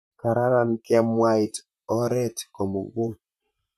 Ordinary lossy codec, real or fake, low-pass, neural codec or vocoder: none; fake; 14.4 kHz; vocoder, 44.1 kHz, 128 mel bands, Pupu-Vocoder